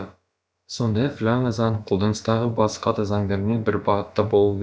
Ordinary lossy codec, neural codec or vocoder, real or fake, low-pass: none; codec, 16 kHz, about 1 kbps, DyCAST, with the encoder's durations; fake; none